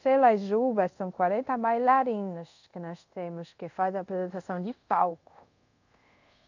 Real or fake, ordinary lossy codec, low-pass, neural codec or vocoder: fake; none; 7.2 kHz; codec, 24 kHz, 0.5 kbps, DualCodec